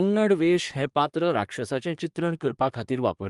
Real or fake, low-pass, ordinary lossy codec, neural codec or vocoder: fake; 10.8 kHz; Opus, 32 kbps; codec, 24 kHz, 1 kbps, SNAC